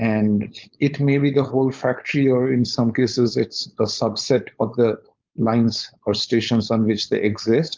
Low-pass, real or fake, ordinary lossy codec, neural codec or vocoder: 7.2 kHz; fake; Opus, 32 kbps; codec, 16 kHz, 4.8 kbps, FACodec